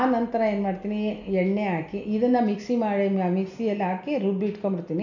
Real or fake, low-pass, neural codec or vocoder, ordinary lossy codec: real; 7.2 kHz; none; none